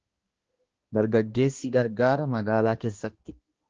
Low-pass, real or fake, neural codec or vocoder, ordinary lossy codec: 7.2 kHz; fake; codec, 16 kHz, 1 kbps, X-Codec, HuBERT features, trained on balanced general audio; Opus, 16 kbps